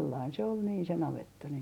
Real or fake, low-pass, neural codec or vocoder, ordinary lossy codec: real; 19.8 kHz; none; none